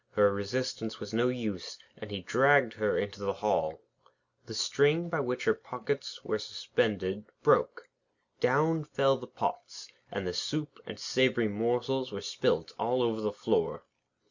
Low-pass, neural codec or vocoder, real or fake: 7.2 kHz; none; real